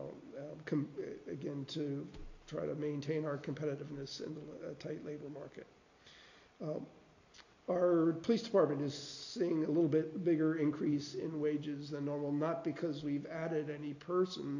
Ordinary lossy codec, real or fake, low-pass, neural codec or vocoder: AAC, 48 kbps; real; 7.2 kHz; none